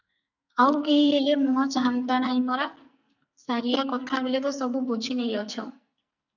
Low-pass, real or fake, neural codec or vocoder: 7.2 kHz; fake; codec, 44.1 kHz, 2.6 kbps, SNAC